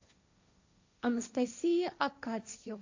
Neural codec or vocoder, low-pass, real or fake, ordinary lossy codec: codec, 16 kHz, 1.1 kbps, Voila-Tokenizer; 7.2 kHz; fake; AAC, 48 kbps